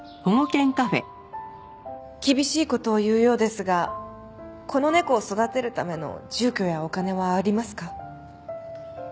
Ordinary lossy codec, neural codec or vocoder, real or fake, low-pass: none; none; real; none